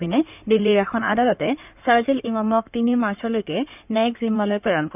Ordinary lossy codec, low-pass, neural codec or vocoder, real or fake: none; 3.6 kHz; codec, 16 kHz in and 24 kHz out, 2.2 kbps, FireRedTTS-2 codec; fake